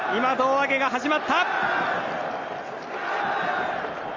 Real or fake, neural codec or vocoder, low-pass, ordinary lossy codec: real; none; 7.2 kHz; Opus, 32 kbps